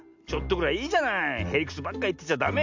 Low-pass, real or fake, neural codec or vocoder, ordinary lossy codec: 7.2 kHz; real; none; MP3, 64 kbps